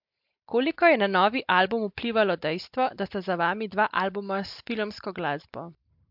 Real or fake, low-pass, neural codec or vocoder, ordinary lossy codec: real; 5.4 kHz; none; MP3, 48 kbps